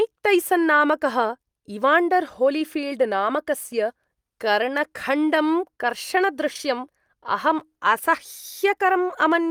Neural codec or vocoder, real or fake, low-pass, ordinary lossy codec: none; real; 19.8 kHz; Opus, 32 kbps